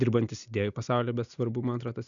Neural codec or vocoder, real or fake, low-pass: none; real; 7.2 kHz